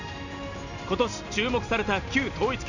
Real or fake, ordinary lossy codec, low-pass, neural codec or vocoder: real; none; 7.2 kHz; none